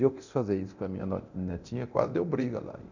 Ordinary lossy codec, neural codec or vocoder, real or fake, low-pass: none; codec, 24 kHz, 0.9 kbps, DualCodec; fake; 7.2 kHz